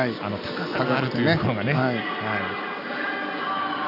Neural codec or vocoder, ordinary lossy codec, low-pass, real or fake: none; none; 5.4 kHz; real